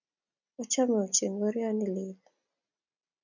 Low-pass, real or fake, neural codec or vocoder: 7.2 kHz; real; none